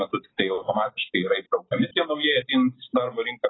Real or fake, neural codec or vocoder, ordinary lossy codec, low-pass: real; none; AAC, 16 kbps; 7.2 kHz